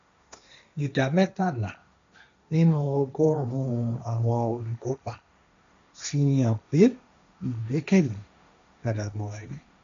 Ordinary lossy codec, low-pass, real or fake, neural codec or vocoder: MP3, 64 kbps; 7.2 kHz; fake; codec, 16 kHz, 1.1 kbps, Voila-Tokenizer